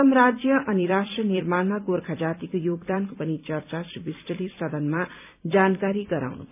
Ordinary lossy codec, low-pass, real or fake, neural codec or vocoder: none; 3.6 kHz; fake; vocoder, 44.1 kHz, 128 mel bands every 256 samples, BigVGAN v2